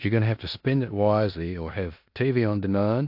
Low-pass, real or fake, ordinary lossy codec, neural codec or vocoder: 5.4 kHz; fake; AAC, 48 kbps; codec, 16 kHz in and 24 kHz out, 0.9 kbps, LongCat-Audio-Codec, four codebook decoder